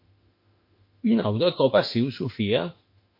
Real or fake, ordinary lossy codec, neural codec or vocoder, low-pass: fake; MP3, 32 kbps; autoencoder, 48 kHz, 32 numbers a frame, DAC-VAE, trained on Japanese speech; 5.4 kHz